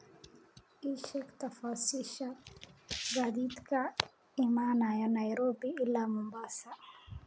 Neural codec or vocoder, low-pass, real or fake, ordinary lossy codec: none; none; real; none